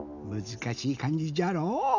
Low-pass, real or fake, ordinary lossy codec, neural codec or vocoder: 7.2 kHz; real; none; none